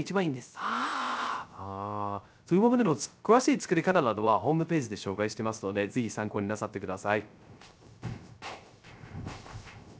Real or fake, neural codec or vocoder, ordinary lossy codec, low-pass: fake; codec, 16 kHz, 0.3 kbps, FocalCodec; none; none